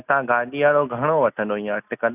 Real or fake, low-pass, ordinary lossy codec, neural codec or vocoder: real; 3.6 kHz; none; none